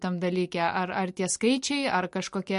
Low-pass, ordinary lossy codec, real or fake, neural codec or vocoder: 10.8 kHz; MP3, 48 kbps; real; none